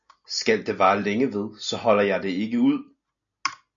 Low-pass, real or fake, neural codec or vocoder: 7.2 kHz; real; none